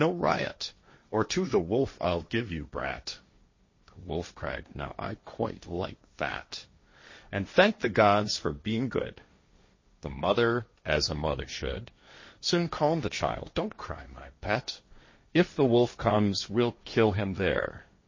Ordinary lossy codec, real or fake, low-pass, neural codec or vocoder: MP3, 32 kbps; fake; 7.2 kHz; codec, 16 kHz, 1.1 kbps, Voila-Tokenizer